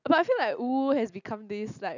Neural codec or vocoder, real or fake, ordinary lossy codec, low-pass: none; real; none; 7.2 kHz